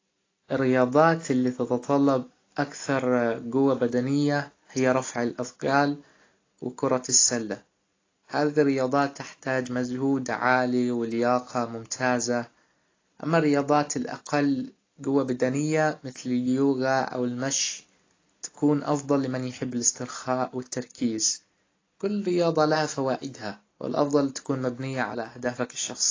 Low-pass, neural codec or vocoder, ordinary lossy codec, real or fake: 7.2 kHz; none; AAC, 32 kbps; real